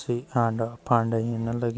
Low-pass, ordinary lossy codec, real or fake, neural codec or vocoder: none; none; real; none